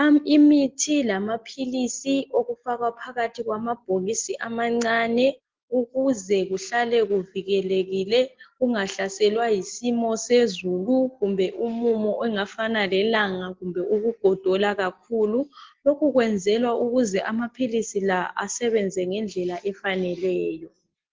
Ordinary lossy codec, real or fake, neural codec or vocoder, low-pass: Opus, 16 kbps; real; none; 7.2 kHz